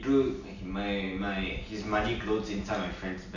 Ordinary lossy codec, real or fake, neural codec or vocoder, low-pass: none; real; none; 7.2 kHz